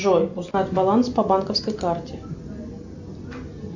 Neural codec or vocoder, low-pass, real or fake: none; 7.2 kHz; real